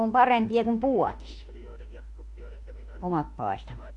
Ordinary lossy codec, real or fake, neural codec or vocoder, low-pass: AAC, 48 kbps; fake; autoencoder, 48 kHz, 32 numbers a frame, DAC-VAE, trained on Japanese speech; 10.8 kHz